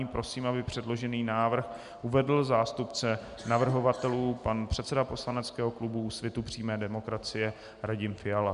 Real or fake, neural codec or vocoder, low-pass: real; none; 10.8 kHz